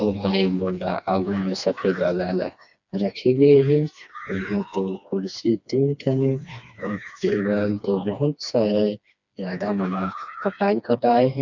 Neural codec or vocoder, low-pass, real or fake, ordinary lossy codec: codec, 16 kHz, 2 kbps, FreqCodec, smaller model; 7.2 kHz; fake; none